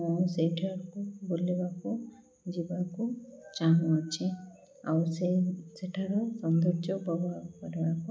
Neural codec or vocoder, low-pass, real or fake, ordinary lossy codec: none; none; real; none